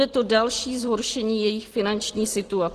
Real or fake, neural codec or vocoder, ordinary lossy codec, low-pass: real; none; Opus, 16 kbps; 14.4 kHz